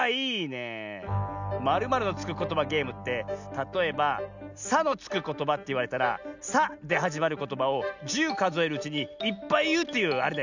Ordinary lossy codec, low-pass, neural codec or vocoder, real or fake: none; 7.2 kHz; none; real